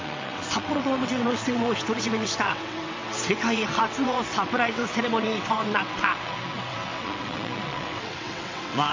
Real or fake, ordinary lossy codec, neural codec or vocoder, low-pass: fake; MP3, 48 kbps; vocoder, 22.05 kHz, 80 mel bands, WaveNeXt; 7.2 kHz